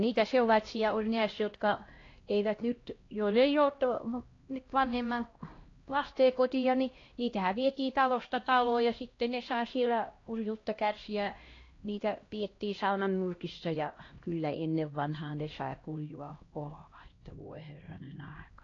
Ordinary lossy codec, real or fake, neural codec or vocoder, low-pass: AAC, 32 kbps; fake; codec, 16 kHz, 1 kbps, X-Codec, HuBERT features, trained on LibriSpeech; 7.2 kHz